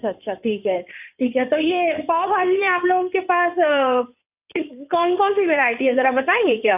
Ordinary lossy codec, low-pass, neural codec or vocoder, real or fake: none; 3.6 kHz; codec, 16 kHz in and 24 kHz out, 2.2 kbps, FireRedTTS-2 codec; fake